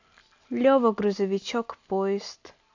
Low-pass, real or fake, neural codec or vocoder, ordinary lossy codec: 7.2 kHz; real; none; AAC, 48 kbps